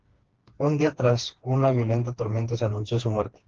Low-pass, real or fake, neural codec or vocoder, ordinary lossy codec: 7.2 kHz; fake; codec, 16 kHz, 2 kbps, FreqCodec, smaller model; Opus, 16 kbps